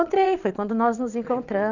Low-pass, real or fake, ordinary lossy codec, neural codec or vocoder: 7.2 kHz; fake; none; vocoder, 22.05 kHz, 80 mel bands, WaveNeXt